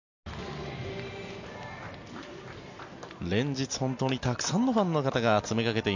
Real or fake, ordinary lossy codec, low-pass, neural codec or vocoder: real; none; 7.2 kHz; none